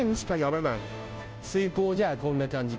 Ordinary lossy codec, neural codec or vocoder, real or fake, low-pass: none; codec, 16 kHz, 0.5 kbps, FunCodec, trained on Chinese and English, 25 frames a second; fake; none